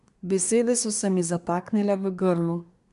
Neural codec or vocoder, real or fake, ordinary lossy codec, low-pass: codec, 24 kHz, 1 kbps, SNAC; fake; none; 10.8 kHz